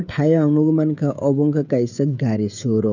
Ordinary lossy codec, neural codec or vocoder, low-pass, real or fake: none; codec, 16 kHz, 16 kbps, FreqCodec, smaller model; 7.2 kHz; fake